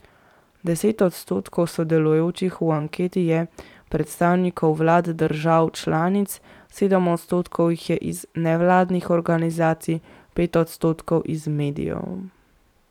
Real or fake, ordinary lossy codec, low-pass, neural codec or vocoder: fake; none; 19.8 kHz; vocoder, 44.1 kHz, 128 mel bands every 512 samples, BigVGAN v2